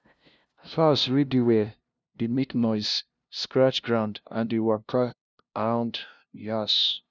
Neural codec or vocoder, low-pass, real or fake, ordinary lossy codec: codec, 16 kHz, 0.5 kbps, FunCodec, trained on LibriTTS, 25 frames a second; none; fake; none